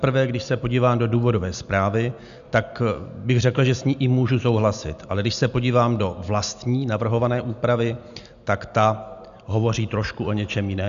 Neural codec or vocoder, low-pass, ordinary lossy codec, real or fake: none; 7.2 kHz; AAC, 96 kbps; real